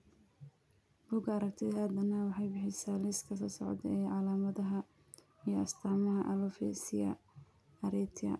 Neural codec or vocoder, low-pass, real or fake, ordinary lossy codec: none; none; real; none